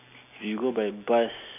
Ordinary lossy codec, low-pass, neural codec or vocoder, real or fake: none; 3.6 kHz; none; real